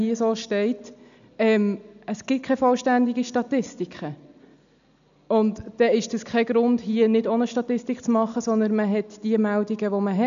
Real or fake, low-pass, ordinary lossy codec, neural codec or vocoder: real; 7.2 kHz; none; none